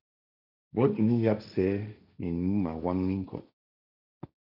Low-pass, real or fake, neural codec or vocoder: 5.4 kHz; fake; codec, 16 kHz, 1.1 kbps, Voila-Tokenizer